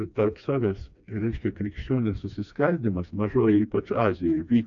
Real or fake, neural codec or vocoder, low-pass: fake; codec, 16 kHz, 2 kbps, FreqCodec, smaller model; 7.2 kHz